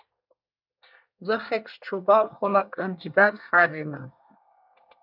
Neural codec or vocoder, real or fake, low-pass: codec, 24 kHz, 1 kbps, SNAC; fake; 5.4 kHz